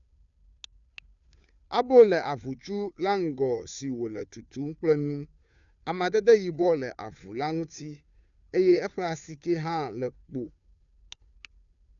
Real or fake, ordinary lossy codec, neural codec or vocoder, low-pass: fake; none; codec, 16 kHz, 2 kbps, FunCodec, trained on Chinese and English, 25 frames a second; 7.2 kHz